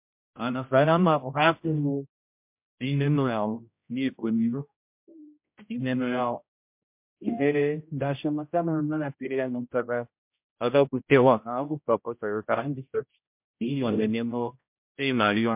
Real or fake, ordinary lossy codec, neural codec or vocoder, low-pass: fake; MP3, 32 kbps; codec, 16 kHz, 0.5 kbps, X-Codec, HuBERT features, trained on general audio; 3.6 kHz